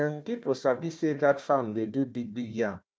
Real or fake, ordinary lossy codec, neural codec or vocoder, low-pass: fake; none; codec, 16 kHz, 1 kbps, FunCodec, trained on Chinese and English, 50 frames a second; none